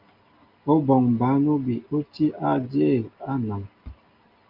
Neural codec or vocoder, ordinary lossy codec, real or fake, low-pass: none; Opus, 24 kbps; real; 5.4 kHz